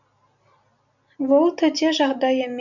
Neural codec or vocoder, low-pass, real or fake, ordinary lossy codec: none; 7.2 kHz; real; none